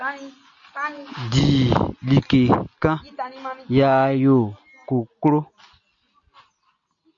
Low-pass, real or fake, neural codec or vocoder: 7.2 kHz; real; none